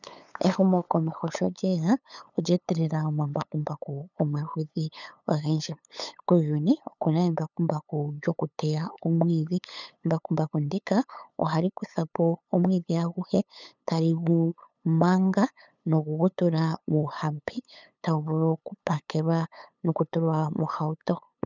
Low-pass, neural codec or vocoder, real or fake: 7.2 kHz; codec, 16 kHz, 8 kbps, FunCodec, trained on LibriTTS, 25 frames a second; fake